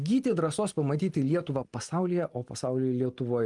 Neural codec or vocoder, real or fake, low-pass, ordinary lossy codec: none; real; 10.8 kHz; Opus, 32 kbps